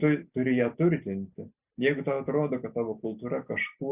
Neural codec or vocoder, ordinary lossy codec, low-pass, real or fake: none; Opus, 64 kbps; 3.6 kHz; real